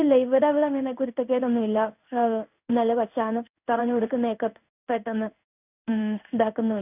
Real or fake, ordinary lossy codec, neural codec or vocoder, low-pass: fake; none; codec, 16 kHz in and 24 kHz out, 1 kbps, XY-Tokenizer; 3.6 kHz